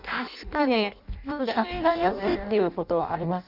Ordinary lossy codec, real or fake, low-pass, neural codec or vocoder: none; fake; 5.4 kHz; codec, 16 kHz in and 24 kHz out, 0.6 kbps, FireRedTTS-2 codec